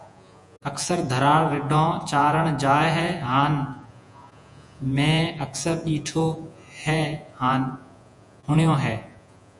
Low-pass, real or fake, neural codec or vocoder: 10.8 kHz; fake; vocoder, 48 kHz, 128 mel bands, Vocos